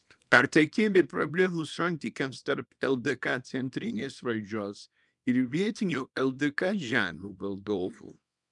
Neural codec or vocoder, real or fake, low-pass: codec, 24 kHz, 0.9 kbps, WavTokenizer, small release; fake; 10.8 kHz